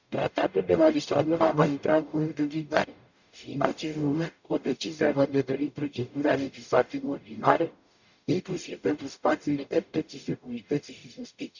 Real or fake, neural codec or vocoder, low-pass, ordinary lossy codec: fake; codec, 44.1 kHz, 0.9 kbps, DAC; 7.2 kHz; none